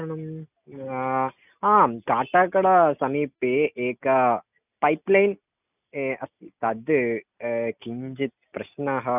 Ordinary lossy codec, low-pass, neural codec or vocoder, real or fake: none; 3.6 kHz; none; real